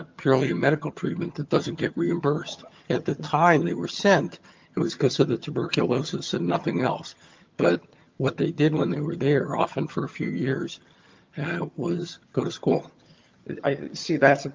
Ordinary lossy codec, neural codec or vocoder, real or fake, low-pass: Opus, 32 kbps; vocoder, 22.05 kHz, 80 mel bands, HiFi-GAN; fake; 7.2 kHz